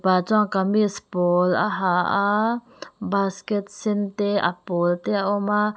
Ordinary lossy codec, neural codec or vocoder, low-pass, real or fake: none; none; none; real